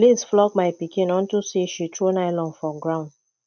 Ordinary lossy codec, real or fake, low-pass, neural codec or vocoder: none; real; 7.2 kHz; none